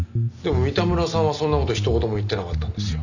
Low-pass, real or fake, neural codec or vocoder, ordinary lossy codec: 7.2 kHz; real; none; none